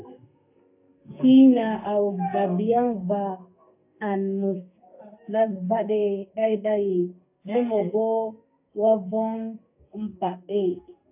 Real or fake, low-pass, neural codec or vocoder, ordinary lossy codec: fake; 3.6 kHz; codec, 32 kHz, 1.9 kbps, SNAC; AAC, 32 kbps